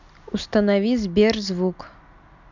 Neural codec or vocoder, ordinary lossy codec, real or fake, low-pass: none; none; real; 7.2 kHz